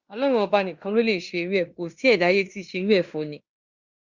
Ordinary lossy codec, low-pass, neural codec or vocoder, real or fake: Opus, 64 kbps; 7.2 kHz; codec, 16 kHz in and 24 kHz out, 0.9 kbps, LongCat-Audio-Codec, fine tuned four codebook decoder; fake